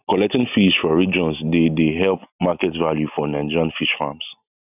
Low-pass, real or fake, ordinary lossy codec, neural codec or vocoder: 3.6 kHz; real; none; none